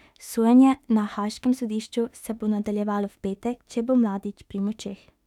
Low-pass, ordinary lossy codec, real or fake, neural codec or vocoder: 19.8 kHz; none; fake; autoencoder, 48 kHz, 32 numbers a frame, DAC-VAE, trained on Japanese speech